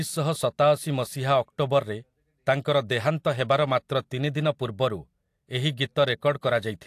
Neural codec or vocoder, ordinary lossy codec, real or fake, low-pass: none; AAC, 64 kbps; real; 14.4 kHz